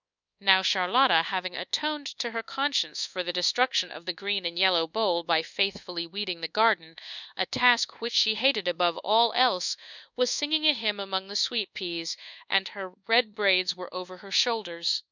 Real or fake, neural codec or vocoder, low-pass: fake; codec, 24 kHz, 1.2 kbps, DualCodec; 7.2 kHz